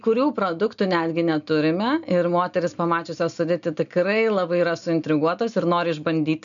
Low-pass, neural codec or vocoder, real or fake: 7.2 kHz; none; real